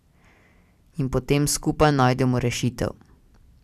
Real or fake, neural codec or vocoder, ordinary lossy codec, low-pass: real; none; none; 14.4 kHz